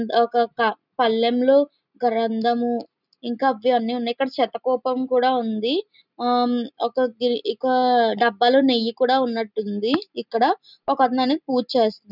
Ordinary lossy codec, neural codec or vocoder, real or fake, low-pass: none; none; real; 5.4 kHz